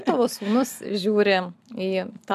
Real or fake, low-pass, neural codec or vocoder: real; 14.4 kHz; none